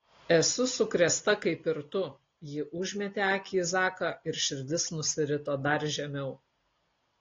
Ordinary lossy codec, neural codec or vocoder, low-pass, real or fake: AAC, 32 kbps; none; 7.2 kHz; real